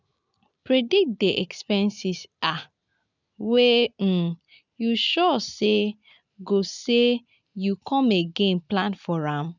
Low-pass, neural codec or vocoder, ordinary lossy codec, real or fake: 7.2 kHz; none; none; real